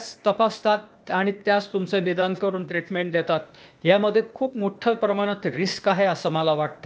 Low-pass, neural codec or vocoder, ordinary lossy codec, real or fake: none; codec, 16 kHz, 0.8 kbps, ZipCodec; none; fake